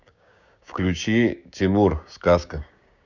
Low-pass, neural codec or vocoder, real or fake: 7.2 kHz; codec, 16 kHz, 6 kbps, DAC; fake